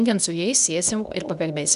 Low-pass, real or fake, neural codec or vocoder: 10.8 kHz; fake; codec, 24 kHz, 0.9 kbps, WavTokenizer, medium speech release version 2